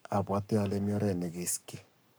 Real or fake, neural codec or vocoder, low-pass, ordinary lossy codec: fake; codec, 44.1 kHz, 7.8 kbps, Pupu-Codec; none; none